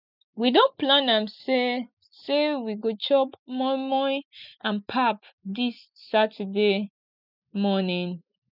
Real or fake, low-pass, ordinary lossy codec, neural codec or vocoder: real; 5.4 kHz; none; none